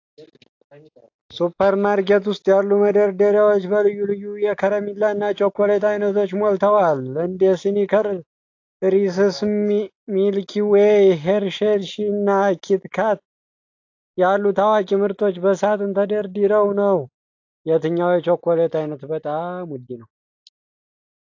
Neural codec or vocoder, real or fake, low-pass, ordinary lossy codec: none; real; 7.2 kHz; AAC, 48 kbps